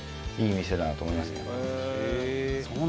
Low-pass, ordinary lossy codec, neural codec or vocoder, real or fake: none; none; none; real